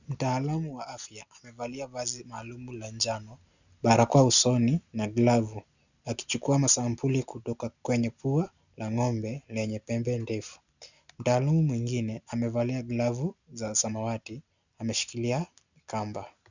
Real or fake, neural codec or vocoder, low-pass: real; none; 7.2 kHz